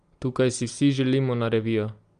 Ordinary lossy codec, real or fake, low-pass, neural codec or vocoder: Opus, 32 kbps; real; 9.9 kHz; none